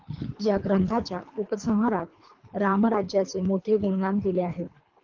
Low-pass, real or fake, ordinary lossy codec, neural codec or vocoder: 7.2 kHz; fake; Opus, 32 kbps; codec, 24 kHz, 3 kbps, HILCodec